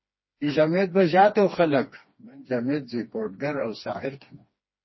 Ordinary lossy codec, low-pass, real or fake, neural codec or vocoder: MP3, 24 kbps; 7.2 kHz; fake; codec, 16 kHz, 2 kbps, FreqCodec, smaller model